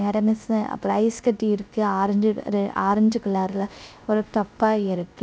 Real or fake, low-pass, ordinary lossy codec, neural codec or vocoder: fake; none; none; codec, 16 kHz, 0.3 kbps, FocalCodec